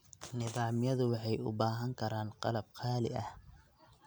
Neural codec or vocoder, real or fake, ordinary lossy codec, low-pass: none; real; none; none